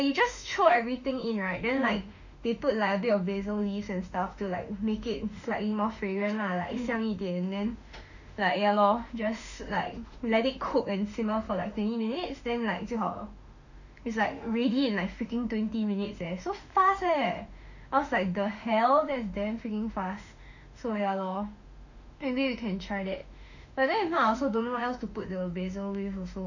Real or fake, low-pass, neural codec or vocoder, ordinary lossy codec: fake; 7.2 kHz; autoencoder, 48 kHz, 32 numbers a frame, DAC-VAE, trained on Japanese speech; none